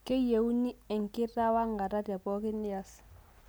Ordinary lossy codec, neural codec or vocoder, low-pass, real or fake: none; none; none; real